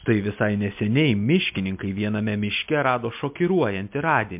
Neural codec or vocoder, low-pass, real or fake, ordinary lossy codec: none; 3.6 kHz; real; MP3, 32 kbps